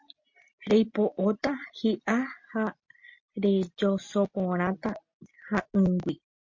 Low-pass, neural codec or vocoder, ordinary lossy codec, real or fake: 7.2 kHz; none; MP3, 48 kbps; real